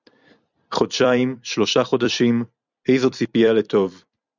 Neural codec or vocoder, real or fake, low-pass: vocoder, 44.1 kHz, 128 mel bands every 256 samples, BigVGAN v2; fake; 7.2 kHz